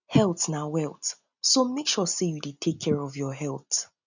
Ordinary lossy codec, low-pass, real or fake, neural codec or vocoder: none; 7.2 kHz; real; none